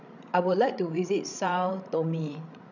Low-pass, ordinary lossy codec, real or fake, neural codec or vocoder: 7.2 kHz; none; fake; codec, 16 kHz, 16 kbps, FreqCodec, larger model